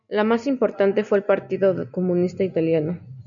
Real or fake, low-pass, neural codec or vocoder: real; 7.2 kHz; none